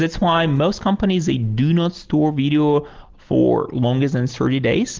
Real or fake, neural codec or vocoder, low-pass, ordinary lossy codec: real; none; 7.2 kHz; Opus, 16 kbps